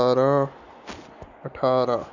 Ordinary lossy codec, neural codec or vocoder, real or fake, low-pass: none; none; real; 7.2 kHz